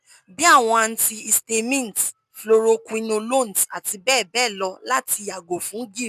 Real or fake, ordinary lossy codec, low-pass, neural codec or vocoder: real; none; 14.4 kHz; none